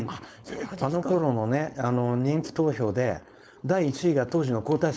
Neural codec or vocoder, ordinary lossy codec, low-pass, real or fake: codec, 16 kHz, 4.8 kbps, FACodec; none; none; fake